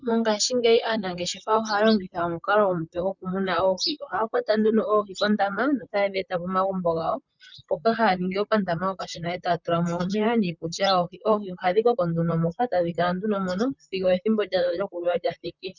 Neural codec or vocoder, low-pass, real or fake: vocoder, 44.1 kHz, 128 mel bands, Pupu-Vocoder; 7.2 kHz; fake